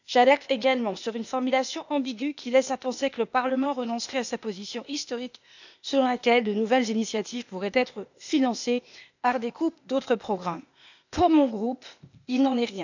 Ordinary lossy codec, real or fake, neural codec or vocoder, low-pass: none; fake; codec, 16 kHz, 0.8 kbps, ZipCodec; 7.2 kHz